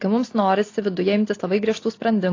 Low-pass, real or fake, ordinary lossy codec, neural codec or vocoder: 7.2 kHz; real; AAC, 32 kbps; none